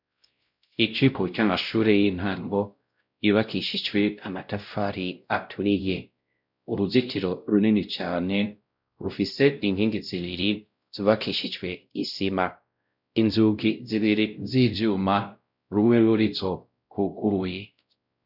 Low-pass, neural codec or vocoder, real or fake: 5.4 kHz; codec, 16 kHz, 0.5 kbps, X-Codec, WavLM features, trained on Multilingual LibriSpeech; fake